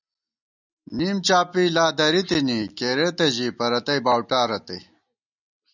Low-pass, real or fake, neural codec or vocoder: 7.2 kHz; real; none